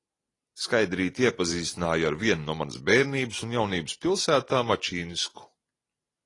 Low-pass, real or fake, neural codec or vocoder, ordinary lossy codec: 10.8 kHz; real; none; AAC, 32 kbps